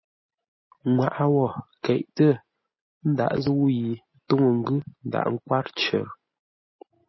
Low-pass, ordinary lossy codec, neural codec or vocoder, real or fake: 7.2 kHz; MP3, 24 kbps; none; real